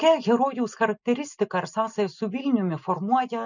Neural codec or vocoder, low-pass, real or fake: none; 7.2 kHz; real